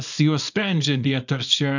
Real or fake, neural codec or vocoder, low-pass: fake; codec, 24 kHz, 0.9 kbps, WavTokenizer, small release; 7.2 kHz